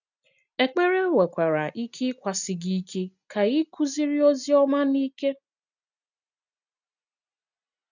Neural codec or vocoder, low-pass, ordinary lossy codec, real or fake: none; 7.2 kHz; none; real